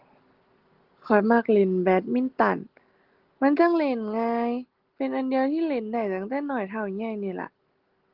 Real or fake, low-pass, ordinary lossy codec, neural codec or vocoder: real; 5.4 kHz; Opus, 16 kbps; none